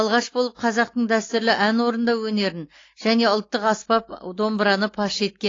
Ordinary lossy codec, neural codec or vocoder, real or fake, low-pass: AAC, 32 kbps; none; real; 7.2 kHz